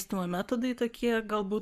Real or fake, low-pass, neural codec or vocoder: fake; 14.4 kHz; codec, 44.1 kHz, 7.8 kbps, Pupu-Codec